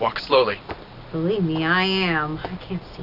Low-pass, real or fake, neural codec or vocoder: 5.4 kHz; real; none